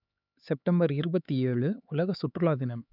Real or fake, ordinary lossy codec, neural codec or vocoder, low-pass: fake; none; codec, 16 kHz, 4 kbps, X-Codec, HuBERT features, trained on LibriSpeech; 5.4 kHz